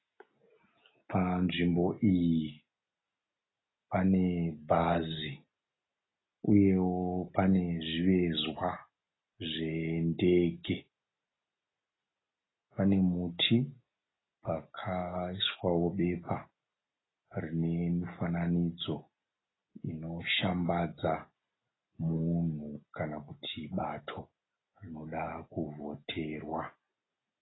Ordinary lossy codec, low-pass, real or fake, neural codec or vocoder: AAC, 16 kbps; 7.2 kHz; real; none